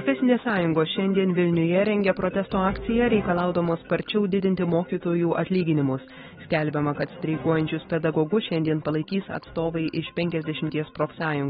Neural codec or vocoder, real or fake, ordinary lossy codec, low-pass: autoencoder, 48 kHz, 128 numbers a frame, DAC-VAE, trained on Japanese speech; fake; AAC, 16 kbps; 19.8 kHz